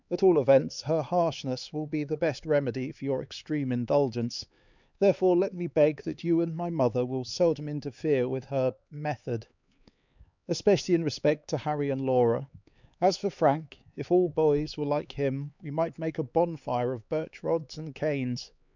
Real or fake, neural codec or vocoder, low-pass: fake; codec, 16 kHz, 4 kbps, X-Codec, HuBERT features, trained on LibriSpeech; 7.2 kHz